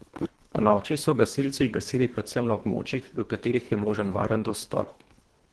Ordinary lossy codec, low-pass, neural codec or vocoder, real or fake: Opus, 16 kbps; 10.8 kHz; codec, 24 kHz, 1.5 kbps, HILCodec; fake